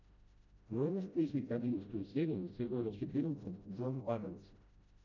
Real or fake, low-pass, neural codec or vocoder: fake; 7.2 kHz; codec, 16 kHz, 0.5 kbps, FreqCodec, smaller model